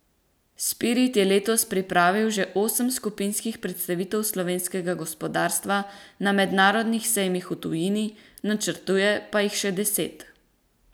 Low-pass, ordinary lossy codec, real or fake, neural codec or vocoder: none; none; real; none